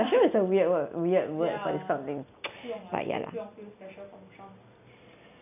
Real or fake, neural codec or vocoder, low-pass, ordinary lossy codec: real; none; 3.6 kHz; none